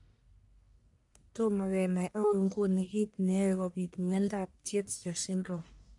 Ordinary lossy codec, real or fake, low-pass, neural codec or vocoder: none; fake; 10.8 kHz; codec, 44.1 kHz, 1.7 kbps, Pupu-Codec